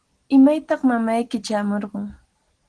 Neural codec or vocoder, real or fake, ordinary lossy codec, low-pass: none; real; Opus, 16 kbps; 9.9 kHz